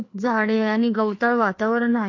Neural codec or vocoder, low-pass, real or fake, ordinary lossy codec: codec, 16 kHz, 2 kbps, FreqCodec, larger model; 7.2 kHz; fake; AAC, 48 kbps